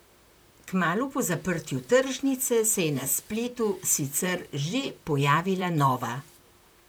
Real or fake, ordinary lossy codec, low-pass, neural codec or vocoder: fake; none; none; vocoder, 44.1 kHz, 128 mel bands, Pupu-Vocoder